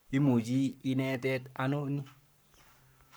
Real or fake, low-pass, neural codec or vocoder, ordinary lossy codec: fake; none; codec, 44.1 kHz, 7.8 kbps, Pupu-Codec; none